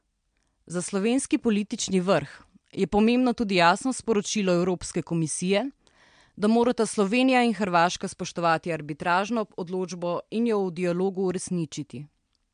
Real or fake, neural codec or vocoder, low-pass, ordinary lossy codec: real; none; 9.9 kHz; MP3, 64 kbps